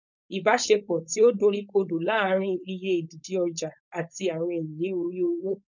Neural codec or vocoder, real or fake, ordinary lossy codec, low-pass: codec, 16 kHz, 4.8 kbps, FACodec; fake; none; 7.2 kHz